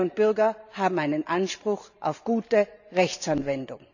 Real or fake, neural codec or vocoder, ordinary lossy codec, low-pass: fake; vocoder, 44.1 kHz, 128 mel bands every 512 samples, BigVGAN v2; none; 7.2 kHz